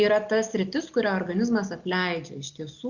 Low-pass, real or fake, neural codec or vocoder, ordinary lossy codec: 7.2 kHz; real; none; Opus, 64 kbps